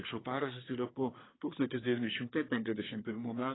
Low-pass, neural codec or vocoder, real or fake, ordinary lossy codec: 7.2 kHz; codec, 24 kHz, 1 kbps, SNAC; fake; AAC, 16 kbps